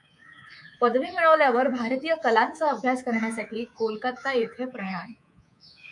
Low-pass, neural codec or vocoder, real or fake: 10.8 kHz; codec, 24 kHz, 3.1 kbps, DualCodec; fake